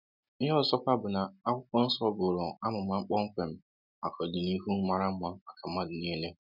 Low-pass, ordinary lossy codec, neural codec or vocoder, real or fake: 5.4 kHz; none; none; real